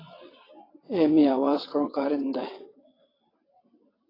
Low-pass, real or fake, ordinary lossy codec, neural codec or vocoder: 5.4 kHz; fake; AAC, 24 kbps; vocoder, 22.05 kHz, 80 mel bands, WaveNeXt